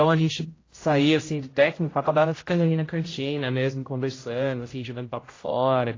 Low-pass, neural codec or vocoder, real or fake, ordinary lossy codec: 7.2 kHz; codec, 16 kHz, 0.5 kbps, X-Codec, HuBERT features, trained on general audio; fake; AAC, 32 kbps